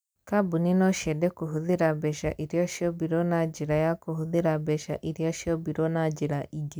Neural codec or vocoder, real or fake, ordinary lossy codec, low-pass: none; real; none; none